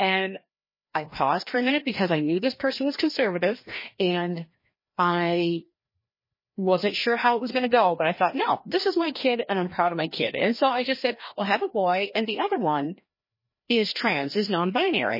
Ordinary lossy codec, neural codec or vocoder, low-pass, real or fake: MP3, 24 kbps; codec, 16 kHz, 1 kbps, FreqCodec, larger model; 5.4 kHz; fake